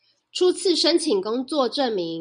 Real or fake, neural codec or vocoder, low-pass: real; none; 9.9 kHz